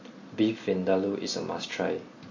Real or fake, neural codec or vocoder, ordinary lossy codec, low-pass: real; none; MP3, 32 kbps; 7.2 kHz